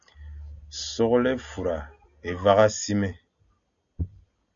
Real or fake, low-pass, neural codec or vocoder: real; 7.2 kHz; none